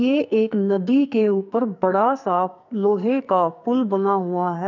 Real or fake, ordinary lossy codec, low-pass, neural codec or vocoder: fake; none; 7.2 kHz; codec, 44.1 kHz, 2.6 kbps, SNAC